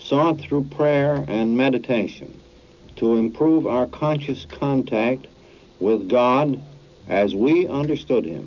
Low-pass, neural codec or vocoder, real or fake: 7.2 kHz; none; real